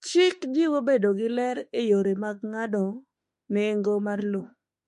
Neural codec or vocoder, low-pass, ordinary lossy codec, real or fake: autoencoder, 48 kHz, 32 numbers a frame, DAC-VAE, trained on Japanese speech; 14.4 kHz; MP3, 48 kbps; fake